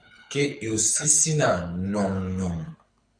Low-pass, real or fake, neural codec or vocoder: 9.9 kHz; fake; codec, 24 kHz, 6 kbps, HILCodec